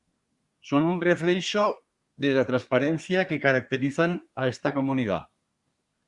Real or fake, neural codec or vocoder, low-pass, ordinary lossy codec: fake; codec, 24 kHz, 1 kbps, SNAC; 10.8 kHz; Opus, 64 kbps